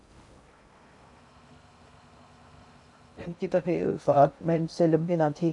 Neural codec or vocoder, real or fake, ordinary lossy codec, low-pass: codec, 16 kHz in and 24 kHz out, 0.6 kbps, FocalCodec, streaming, 2048 codes; fake; AAC, 64 kbps; 10.8 kHz